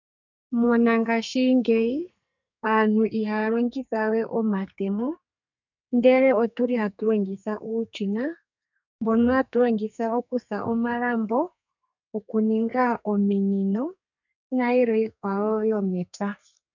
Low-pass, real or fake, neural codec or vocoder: 7.2 kHz; fake; codec, 32 kHz, 1.9 kbps, SNAC